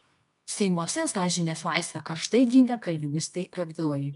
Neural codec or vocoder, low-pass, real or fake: codec, 24 kHz, 0.9 kbps, WavTokenizer, medium music audio release; 10.8 kHz; fake